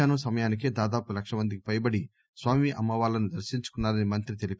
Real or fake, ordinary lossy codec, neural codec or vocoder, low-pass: real; none; none; none